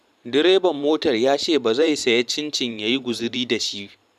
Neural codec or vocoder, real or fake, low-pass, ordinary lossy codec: vocoder, 44.1 kHz, 128 mel bands every 512 samples, BigVGAN v2; fake; 14.4 kHz; none